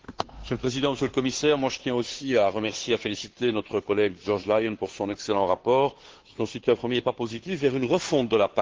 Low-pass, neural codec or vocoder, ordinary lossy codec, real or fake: 7.2 kHz; codec, 16 kHz, 2 kbps, FunCodec, trained on Chinese and English, 25 frames a second; Opus, 16 kbps; fake